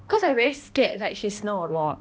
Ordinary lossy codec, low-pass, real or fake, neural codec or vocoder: none; none; fake; codec, 16 kHz, 1 kbps, X-Codec, HuBERT features, trained on balanced general audio